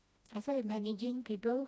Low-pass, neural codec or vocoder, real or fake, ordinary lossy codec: none; codec, 16 kHz, 1 kbps, FreqCodec, smaller model; fake; none